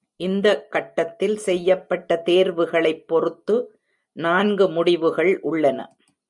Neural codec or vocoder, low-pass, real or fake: none; 10.8 kHz; real